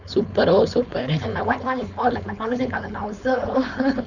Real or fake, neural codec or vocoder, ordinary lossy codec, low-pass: fake; codec, 16 kHz, 4.8 kbps, FACodec; none; 7.2 kHz